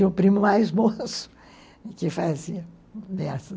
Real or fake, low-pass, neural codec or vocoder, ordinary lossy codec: real; none; none; none